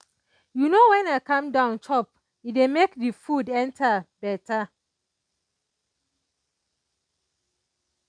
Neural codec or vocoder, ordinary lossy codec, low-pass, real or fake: none; none; 9.9 kHz; real